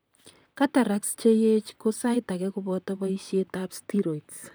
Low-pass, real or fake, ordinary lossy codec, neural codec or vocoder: none; fake; none; vocoder, 44.1 kHz, 128 mel bands, Pupu-Vocoder